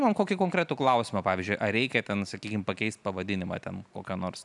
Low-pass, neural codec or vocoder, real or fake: 10.8 kHz; none; real